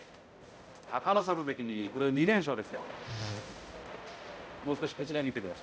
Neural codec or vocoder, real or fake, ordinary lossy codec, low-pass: codec, 16 kHz, 0.5 kbps, X-Codec, HuBERT features, trained on balanced general audio; fake; none; none